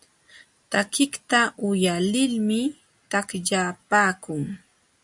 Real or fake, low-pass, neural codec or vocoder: real; 10.8 kHz; none